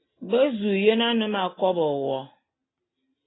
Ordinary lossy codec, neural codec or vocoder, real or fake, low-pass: AAC, 16 kbps; none; real; 7.2 kHz